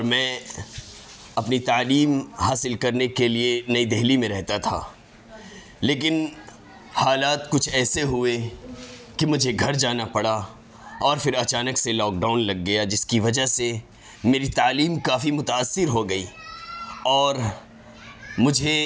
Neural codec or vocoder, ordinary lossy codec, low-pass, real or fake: none; none; none; real